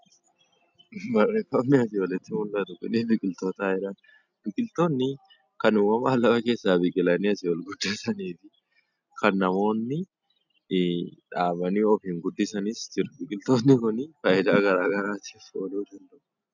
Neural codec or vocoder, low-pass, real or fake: none; 7.2 kHz; real